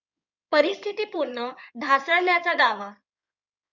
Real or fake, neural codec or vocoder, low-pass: fake; codec, 16 kHz in and 24 kHz out, 2.2 kbps, FireRedTTS-2 codec; 7.2 kHz